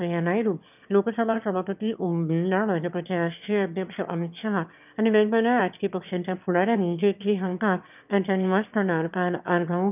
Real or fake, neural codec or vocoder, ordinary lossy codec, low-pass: fake; autoencoder, 22.05 kHz, a latent of 192 numbers a frame, VITS, trained on one speaker; none; 3.6 kHz